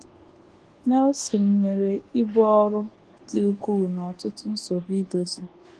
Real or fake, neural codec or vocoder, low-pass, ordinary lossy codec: fake; codec, 24 kHz, 1.2 kbps, DualCodec; 10.8 kHz; Opus, 16 kbps